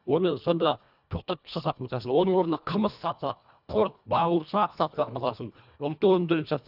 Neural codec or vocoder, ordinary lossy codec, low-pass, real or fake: codec, 24 kHz, 1.5 kbps, HILCodec; none; 5.4 kHz; fake